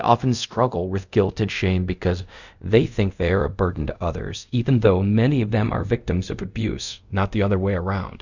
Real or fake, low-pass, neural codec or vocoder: fake; 7.2 kHz; codec, 24 kHz, 0.5 kbps, DualCodec